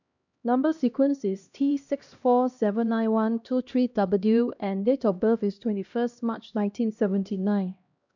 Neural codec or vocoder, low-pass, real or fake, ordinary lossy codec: codec, 16 kHz, 1 kbps, X-Codec, HuBERT features, trained on LibriSpeech; 7.2 kHz; fake; none